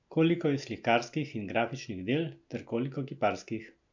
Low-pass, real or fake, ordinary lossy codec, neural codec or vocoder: 7.2 kHz; real; MP3, 64 kbps; none